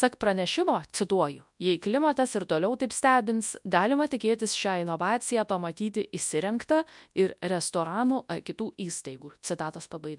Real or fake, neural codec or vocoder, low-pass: fake; codec, 24 kHz, 0.9 kbps, WavTokenizer, large speech release; 10.8 kHz